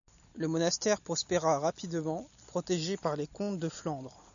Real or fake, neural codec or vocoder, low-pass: real; none; 7.2 kHz